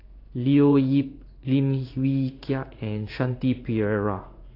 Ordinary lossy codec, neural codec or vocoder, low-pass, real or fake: AAC, 32 kbps; codec, 16 kHz in and 24 kHz out, 1 kbps, XY-Tokenizer; 5.4 kHz; fake